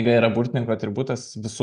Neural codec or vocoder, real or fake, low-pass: none; real; 9.9 kHz